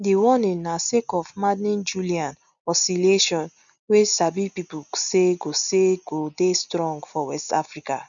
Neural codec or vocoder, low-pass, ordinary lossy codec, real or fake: none; 7.2 kHz; none; real